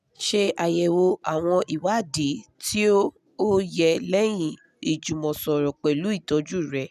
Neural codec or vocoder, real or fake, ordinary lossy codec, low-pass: none; real; none; 14.4 kHz